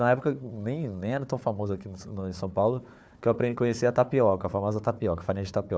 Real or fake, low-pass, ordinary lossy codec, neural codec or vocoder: fake; none; none; codec, 16 kHz, 4 kbps, FunCodec, trained on Chinese and English, 50 frames a second